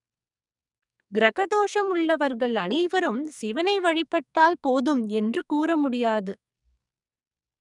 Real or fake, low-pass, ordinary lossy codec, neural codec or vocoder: fake; 10.8 kHz; none; codec, 44.1 kHz, 2.6 kbps, SNAC